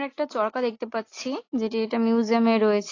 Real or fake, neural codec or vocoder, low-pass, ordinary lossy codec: real; none; 7.2 kHz; AAC, 32 kbps